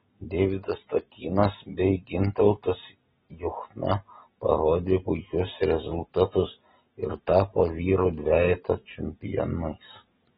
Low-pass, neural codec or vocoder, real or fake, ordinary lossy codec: 19.8 kHz; none; real; AAC, 16 kbps